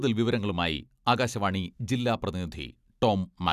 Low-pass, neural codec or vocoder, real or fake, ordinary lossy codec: 14.4 kHz; none; real; none